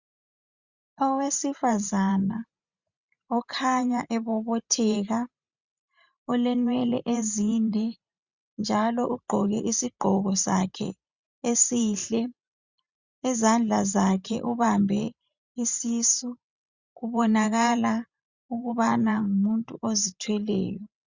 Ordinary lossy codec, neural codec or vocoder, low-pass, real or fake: Opus, 64 kbps; vocoder, 44.1 kHz, 128 mel bands every 512 samples, BigVGAN v2; 7.2 kHz; fake